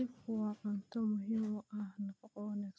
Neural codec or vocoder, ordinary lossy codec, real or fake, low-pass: none; none; real; none